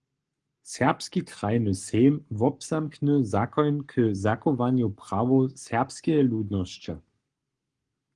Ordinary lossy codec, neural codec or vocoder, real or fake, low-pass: Opus, 24 kbps; codec, 44.1 kHz, 7.8 kbps, Pupu-Codec; fake; 10.8 kHz